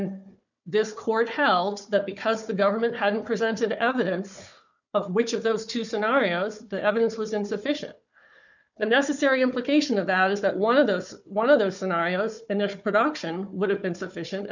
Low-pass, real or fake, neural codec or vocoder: 7.2 kHz; fake; codec, 16 kHz, 4 kbps, FunCodec, trained on Chinese and English, 50 frames a second